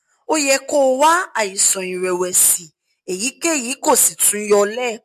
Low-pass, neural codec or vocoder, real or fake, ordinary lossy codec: 14.4 kHz; none; real; MP3, 64 kbps